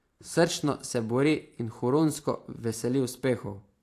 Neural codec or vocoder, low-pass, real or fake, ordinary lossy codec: vocoder, 44.1 kHz, 128 mel bands every 512 samples, BigVGAN v2; 14.4 kHz; fake; AAC, 64 kbps